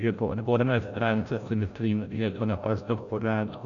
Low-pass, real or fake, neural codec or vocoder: 7.2 kHz; fake; codec, 16 kHz, 0.5 kbps, FreqCodec, larger model